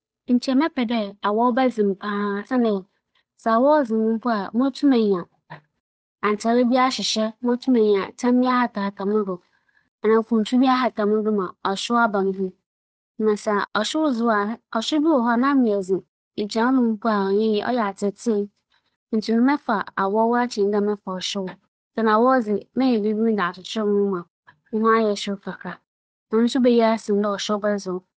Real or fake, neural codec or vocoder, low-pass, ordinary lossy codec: fake; codec, 16 kHz, 8 kbps, FunCodec, trained on Chinese and English, 25 frames a second; none; none